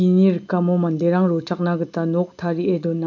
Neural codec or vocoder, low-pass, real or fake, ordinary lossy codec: none; 7.2 kHz; real; none